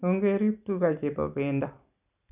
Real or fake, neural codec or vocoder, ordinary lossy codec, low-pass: real; none; none; 3.6 kHz